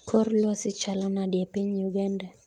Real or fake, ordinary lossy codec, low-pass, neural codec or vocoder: real; Opus, 32 kbps; 19.8 kHz; none